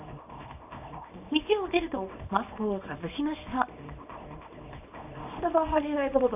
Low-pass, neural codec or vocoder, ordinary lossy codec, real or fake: 3.6 kHz; codec, 24 kHz, 0.9 kbps, WavTokenizer, small release; AAC, 32 kbps; fake